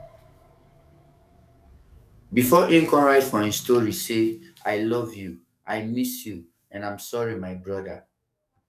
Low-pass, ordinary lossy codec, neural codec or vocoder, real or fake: 14.4 kHz; none; codec, 44.1 kHz, 7.8 kbps, DAC; fake